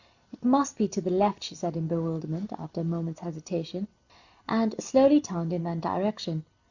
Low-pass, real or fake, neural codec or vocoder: 7.2 kHz; real; none